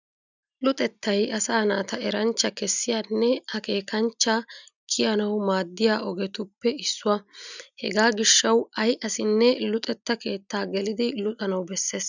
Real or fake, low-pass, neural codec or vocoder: real; 7.2 kHz; none